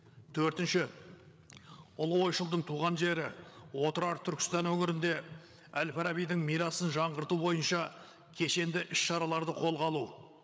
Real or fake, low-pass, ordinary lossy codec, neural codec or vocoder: fake; none; none; codec, 16 kHz, 8 kbps, FreqCodec, larger model